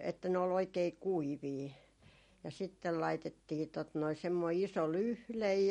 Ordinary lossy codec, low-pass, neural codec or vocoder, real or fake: MP3, 48 kbps; 14.4 kHz; none; real